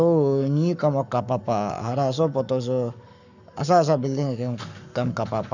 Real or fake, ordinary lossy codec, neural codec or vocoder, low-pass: fake; none; codec, 44.1 kHz, 7.8 kbps, Pupu-Codec; 7.2 kHz